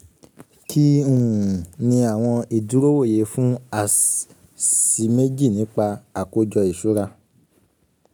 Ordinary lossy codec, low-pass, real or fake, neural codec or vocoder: none; none; real; none